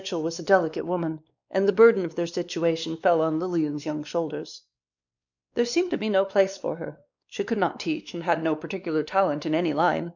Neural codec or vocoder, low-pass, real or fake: codec, 16 kHz, 2 kbps, X-Codec, WavLM features, trained on Multilingual LibriSpeech; 7.2 kHz; fake